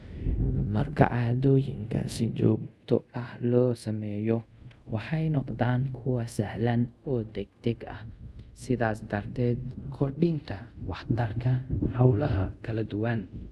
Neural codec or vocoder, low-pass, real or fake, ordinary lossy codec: codec, 24 kHz, 0.5 kbps, DualCodec; none; fake; none